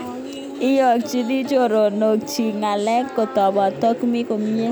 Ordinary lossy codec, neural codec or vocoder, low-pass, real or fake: none; none; none; real